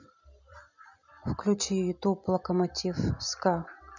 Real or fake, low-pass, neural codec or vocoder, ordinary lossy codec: real; 7.2 kHz; none; none